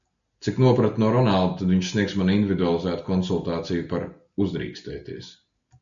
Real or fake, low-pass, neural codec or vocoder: real; 7.2 kHz; none